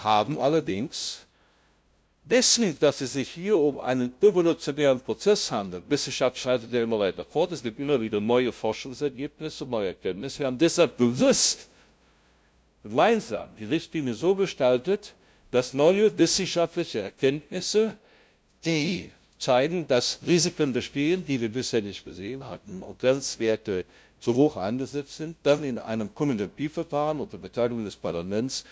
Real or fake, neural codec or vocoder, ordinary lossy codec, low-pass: fake; codec, 16 kHz, 0.5 kbps, FunCodec, trained on LibriTTS, 25 frames a second; none; none